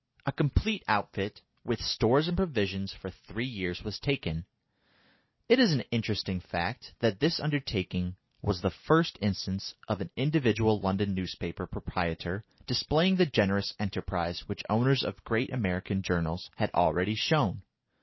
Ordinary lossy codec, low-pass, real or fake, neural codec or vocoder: MP3, 24 kbps; 7.2 kHz; real; none